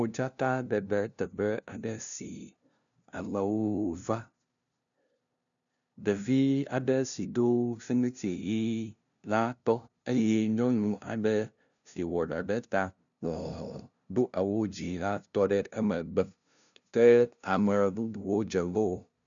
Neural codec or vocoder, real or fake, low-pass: codec, 16 kHz, 0.5 kbps, FunCodec, trained on LibriTTS, 25 frames a second; fake; 7.2 kHz